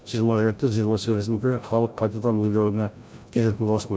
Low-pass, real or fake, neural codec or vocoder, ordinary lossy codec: none; fake; codec, 16 kHz, 0.5 kbps, FreqCodec, larger model; none